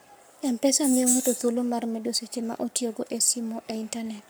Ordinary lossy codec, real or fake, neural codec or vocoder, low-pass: none; fake; codec, 44.1 kHz, 7.8 kbps, Pupu-Codec; none